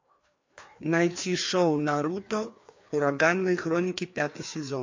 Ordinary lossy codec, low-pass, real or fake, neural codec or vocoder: MP3, 48 kbps; 7.2 kHz; fake; codec, 16 kHz, 2 kbps, FreqCodec, larger model